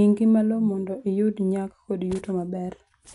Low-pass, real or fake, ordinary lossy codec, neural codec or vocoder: 10.8 kHz; real; none; none